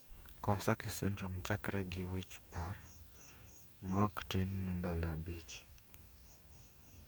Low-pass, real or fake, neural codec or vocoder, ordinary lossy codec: none; fake; codec, 44.1 kHz, 2.6 kbps, DAC; none